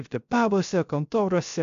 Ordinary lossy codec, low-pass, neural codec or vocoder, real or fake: AAC, 64 kbps; 7.2 kHz; codec, 16 kHz, 0.3 kbps, FocalCodec; fake